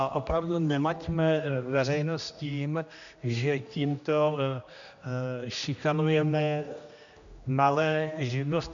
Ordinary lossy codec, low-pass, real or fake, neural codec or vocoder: AAC, 64 kbps; 7.2 kHz; fake; codec, 16 kHz, 1 kbps, X-Codec, HuBERT features, trained on general audio